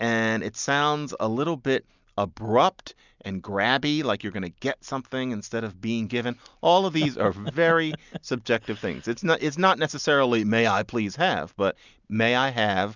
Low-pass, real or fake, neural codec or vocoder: 7.2 kHz; real; none